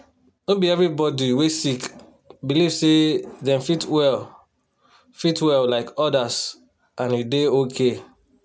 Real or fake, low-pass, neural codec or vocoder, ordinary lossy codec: real; none; none; none